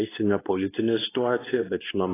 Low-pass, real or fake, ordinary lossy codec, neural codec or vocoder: 3.6 kHz; fake; AAC, 16 kbps; codec, 24 kHz, 0.9 kbps, WavTokenizer, medium speech release version 2